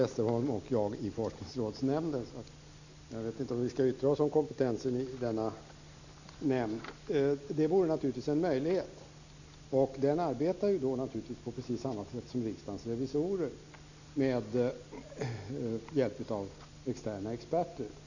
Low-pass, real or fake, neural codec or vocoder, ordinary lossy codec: 7.2 kHz; real; none; none